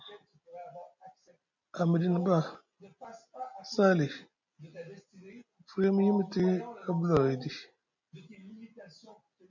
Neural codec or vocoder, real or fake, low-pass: none; real; 7.2 kHz